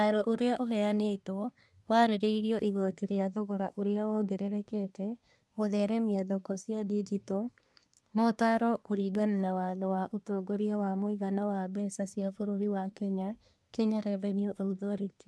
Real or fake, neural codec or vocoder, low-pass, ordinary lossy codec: fake; codec, 24 kHz, 1 kbps, SNAC; none; none